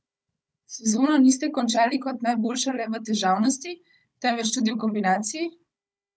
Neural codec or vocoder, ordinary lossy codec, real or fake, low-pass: codec, 16 kHz, 16 kbps, FunCodec, trained on Chinese and English, 50 frames a second; none; fake; none